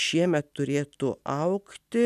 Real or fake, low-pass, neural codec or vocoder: real; 14.4 kHz; none